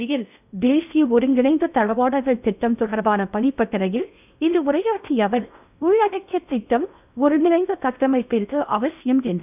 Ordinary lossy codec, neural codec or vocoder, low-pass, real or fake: none; codec, 16 kHz in and 24 kHz out, 0.6 kbps, FocalCodec, streaming, 4096 codes; 3.6 kHz; fake